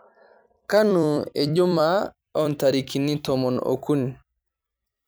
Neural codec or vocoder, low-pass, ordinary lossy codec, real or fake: vocoder, 44.1 kHz, 128 mel bands every 256 samples, BigVGAN v2; none; none; fake